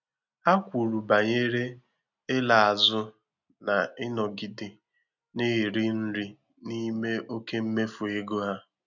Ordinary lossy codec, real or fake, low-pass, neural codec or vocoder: none; real; 7.2 kHz; none